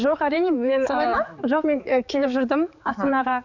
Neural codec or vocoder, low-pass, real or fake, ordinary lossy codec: codec, 16 kHz, 4 kbps, X-Codec, HuBERT features, trained on balanced general audio; 7.2 kHz; fake; none